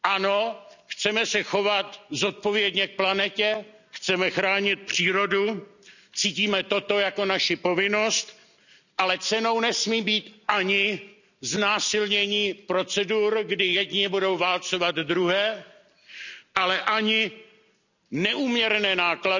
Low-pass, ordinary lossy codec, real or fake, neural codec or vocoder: 7.2 kHz; none; real; none